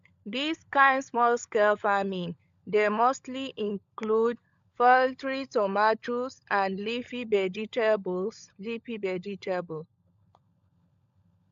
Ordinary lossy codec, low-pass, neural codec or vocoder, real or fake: MP3, 64 kbps; 7.2 kHz; codec, 16 kHz, 16 kbps, FunCodec, trained on LibriTTS, 50 frames a second; fake